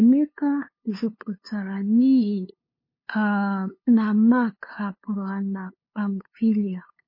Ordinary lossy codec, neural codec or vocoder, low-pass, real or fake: MP3, 24 kbps; codec, 16 kHz, 2 kbps, FunCodec, trained on LibriTTS, 25 frames a second; 5.4 kHz; fake